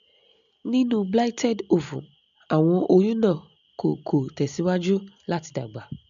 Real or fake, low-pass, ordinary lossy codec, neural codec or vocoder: real; 7.2 kHz; AAC, 96 kbps; none